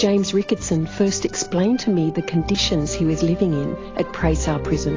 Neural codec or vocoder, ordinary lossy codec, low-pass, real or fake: none; AAC, 32 kbps; 7.2 kHz; real